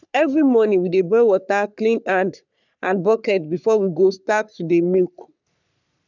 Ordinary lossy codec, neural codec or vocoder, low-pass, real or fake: none; codec, 44.1 kHz, 3.4 kbps, Pupu-Codec; 7.2 kHz; fake